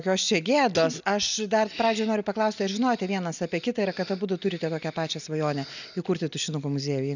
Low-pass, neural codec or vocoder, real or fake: 7.2 kHz; none; real